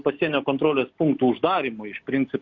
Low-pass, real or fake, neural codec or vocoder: 7.2 kHz; real; none